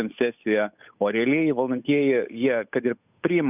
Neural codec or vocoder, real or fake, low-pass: codec, 16 kHz, 8 kbps, FunCodec, trained on Chinese and English, 25 frames a second; fake; 3.6 kHz